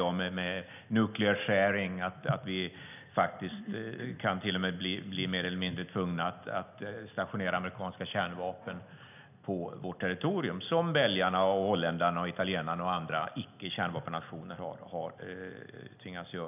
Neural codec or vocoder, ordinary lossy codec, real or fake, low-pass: none; none; real; 3.6 kHz